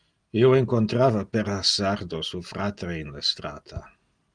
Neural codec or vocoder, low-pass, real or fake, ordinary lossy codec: none; 9.9 kHz; real; Opus, 32 kbps